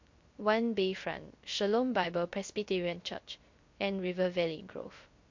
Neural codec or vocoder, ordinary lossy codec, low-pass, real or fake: codec, 16 kHz, 0.3 kbps, FocalCodec; MP3, 48 kbps; 7.2 kHz; fake